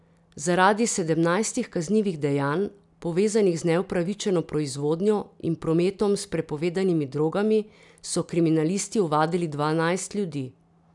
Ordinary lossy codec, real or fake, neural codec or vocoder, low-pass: none; real; none; 10.8 kHz